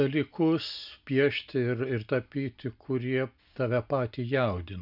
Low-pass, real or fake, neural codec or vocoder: 5.4 kHz; real; none